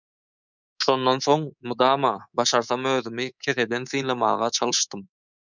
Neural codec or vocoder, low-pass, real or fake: codec, 24 kHz, 3.1 kbps, DualCodec; 7.2 kHz; fake